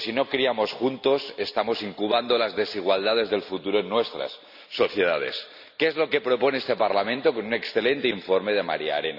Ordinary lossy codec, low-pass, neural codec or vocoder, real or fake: none; 5.4 kHz; none; real